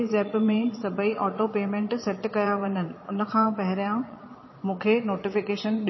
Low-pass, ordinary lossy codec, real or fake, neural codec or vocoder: 7.2 kHz; MP3, 24 kbps; fake; vocoder, 22.05 kHz, 80 mel bands, Vocos